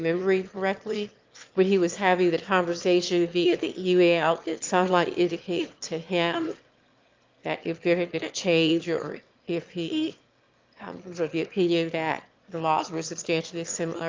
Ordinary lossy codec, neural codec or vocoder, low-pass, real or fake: Opus, 32 kbps; autoencoder, 22.05 kHz, a latent of 192 numbers a frame, VITS, trained on one speaker; 7.2 kHz; fake